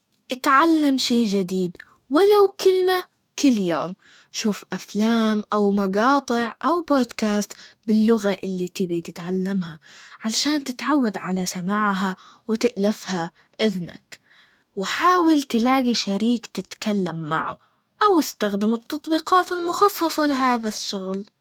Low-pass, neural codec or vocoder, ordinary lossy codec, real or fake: 19.8 kHz; codec, 44.1 kHz, 2.6 kbps, DAC; none; fake